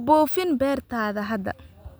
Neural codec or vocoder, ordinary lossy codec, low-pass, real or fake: none; none; none; real